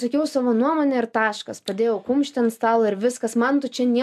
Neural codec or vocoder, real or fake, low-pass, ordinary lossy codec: none; real; 14.4 kHz; MP3, 96 kbps